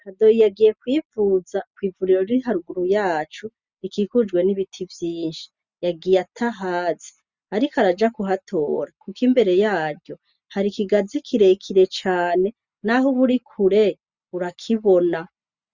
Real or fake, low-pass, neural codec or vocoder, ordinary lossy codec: real; 7.2 kHz; none; Opus, 64 kbps